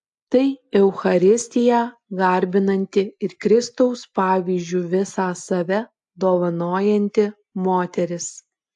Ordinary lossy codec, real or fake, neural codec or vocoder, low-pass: AAC, 64 kbps; real; none; 10.8 kHz